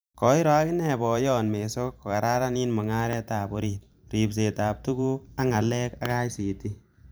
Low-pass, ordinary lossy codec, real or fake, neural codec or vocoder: none; none; fake; vocoder, 44.1 kHz, 128 mel bands every 512 samples, BigVGAN v2